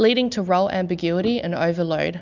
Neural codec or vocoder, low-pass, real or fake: none; 7.2 kHz; real